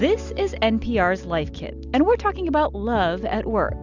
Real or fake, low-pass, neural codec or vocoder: real; 7.2 kHz; none